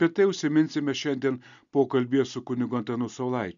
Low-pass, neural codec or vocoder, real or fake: 7.2 kHz; none; real